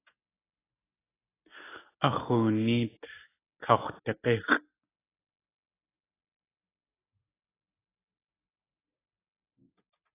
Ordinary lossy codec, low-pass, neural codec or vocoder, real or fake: AAC, 16 kbps; 3.6 kHz; none; real